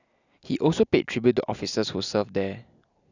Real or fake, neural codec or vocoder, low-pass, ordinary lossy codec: real; none; 7.2 kHz; none